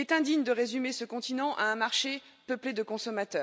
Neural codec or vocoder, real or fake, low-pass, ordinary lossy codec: none; real; none; none